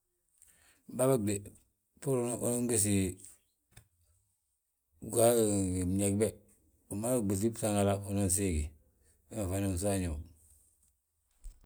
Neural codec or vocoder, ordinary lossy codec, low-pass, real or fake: none; none; none; real